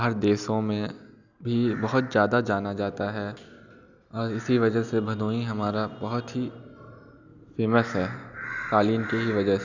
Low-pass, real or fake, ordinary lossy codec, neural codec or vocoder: 7.2 kHz; real; none; none